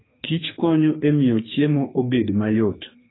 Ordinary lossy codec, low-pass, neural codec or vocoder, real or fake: AAC, 16 kbps; 7.2 kHz; codec, 44.1 kHz, 2.6 kbps, SNAC; fake